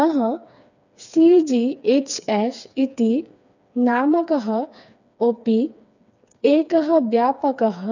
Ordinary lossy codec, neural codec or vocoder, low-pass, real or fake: none; codec, 16 kHz, 4 kbps, FreqCodec, smaller model; 7.2 kHz; fake